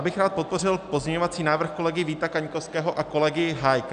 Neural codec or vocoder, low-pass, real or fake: none; 9.9 kHz; real